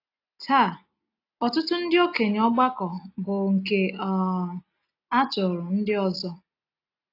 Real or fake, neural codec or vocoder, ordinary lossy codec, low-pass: real; none; AAC, 32 kbps; 5.4 kHz